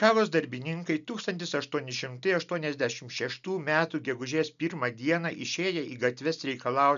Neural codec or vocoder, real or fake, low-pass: none; real; 7.2 kHz